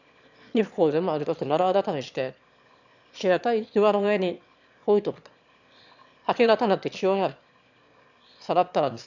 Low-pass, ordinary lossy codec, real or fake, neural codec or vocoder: 7.2 kHz; none; fake; autoencoder, 22.05 kHz, a latent of 192 numbers a frame, VITS, trained on one speaker